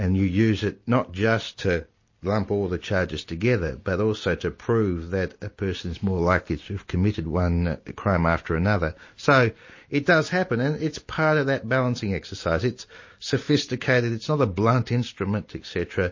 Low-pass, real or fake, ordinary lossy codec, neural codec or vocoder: 7.2 kHz; real; MP3, 32 kbps; none